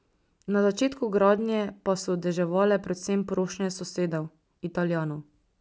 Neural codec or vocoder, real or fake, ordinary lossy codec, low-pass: none; real; none; none